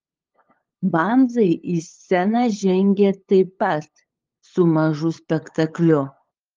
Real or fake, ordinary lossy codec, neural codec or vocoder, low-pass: fake; Opus, 32 kbps; codec, 16 kHz, 8 kbps, FunCodec, trained on LibriTTS, 25 frames a second; 7.2 kHz